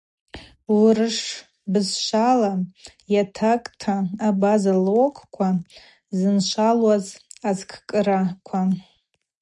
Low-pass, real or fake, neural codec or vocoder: 10.8 kHz; real; none